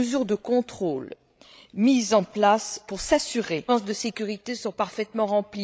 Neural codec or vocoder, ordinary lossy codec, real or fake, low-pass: codec, 16 kHz, 16 kbps, FreqCodec, larger model; none; fake; none